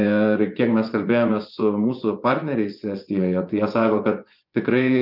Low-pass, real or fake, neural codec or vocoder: 5.4 kHz; fake; vocoder, 24 kHz, 100 mel bands, Vocos